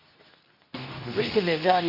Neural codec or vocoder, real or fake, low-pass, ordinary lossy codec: codec, 24 kHz, 0.9 kbps, WavTokenizer, medium speech release version 2; fake; 5.4 kHz; none